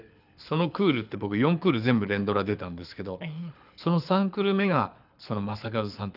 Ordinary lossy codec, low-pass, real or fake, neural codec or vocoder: none; 5.4 kHz; fake; codec, 24 kHz, 6 kbps, HILCodec